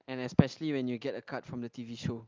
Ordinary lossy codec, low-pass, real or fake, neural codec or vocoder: Opus, 32 kbps; 7.2 kHz; real; none